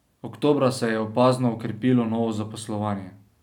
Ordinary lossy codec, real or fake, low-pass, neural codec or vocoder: none; real; 19.8 kHz; none